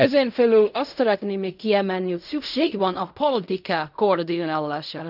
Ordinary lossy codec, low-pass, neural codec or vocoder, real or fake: none; 5.4 kHz; codec, 16 kHz in and 24 kHz out, 0.4 kbps, LongCat-Audio-Codec, fine tuned four codebook decoder; fake